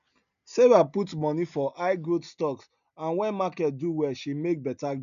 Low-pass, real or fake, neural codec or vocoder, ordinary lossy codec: 7.2 kHz; real; none; none